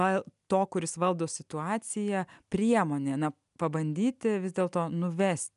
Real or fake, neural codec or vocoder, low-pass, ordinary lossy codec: real; none; 10.8 kHz; MP3, 96 kbps